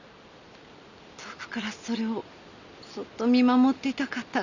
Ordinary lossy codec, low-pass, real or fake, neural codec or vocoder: none; 7.2 kHz; real; none